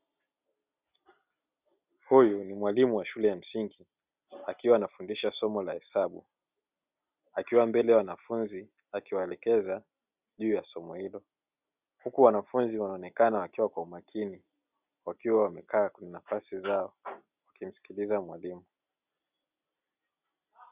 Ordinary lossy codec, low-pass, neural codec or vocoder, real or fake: Opus, 64 kbps; 3.6 kHz; none; real